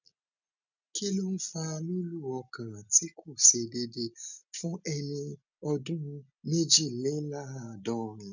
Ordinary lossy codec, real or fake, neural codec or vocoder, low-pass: none; real; none; 7.2 kHz